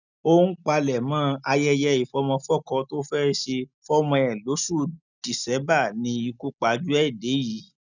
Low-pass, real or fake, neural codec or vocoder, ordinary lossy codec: 7.2 kHz; real; none; none